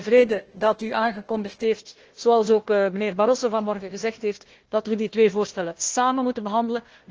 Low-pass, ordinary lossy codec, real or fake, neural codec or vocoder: 7.2 kHz; Opus, 16 kbps; fake; codec, 16 kHz, 1 kbps, FunCodec, trained on Chinese and English, 50 frames a second